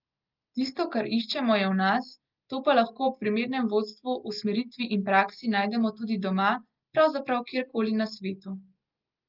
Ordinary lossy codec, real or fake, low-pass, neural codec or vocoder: Opus, 32 kbps; real; 5.4 kHz; none